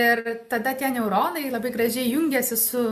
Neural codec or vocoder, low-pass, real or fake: none; 14.4 kHz; real